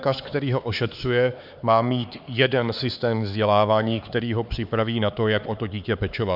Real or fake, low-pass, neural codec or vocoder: fake; 5.4 kHz; codec, 16 kHz, 4 kbps, X-Codec, HuBERT features, trained on LibriSpeech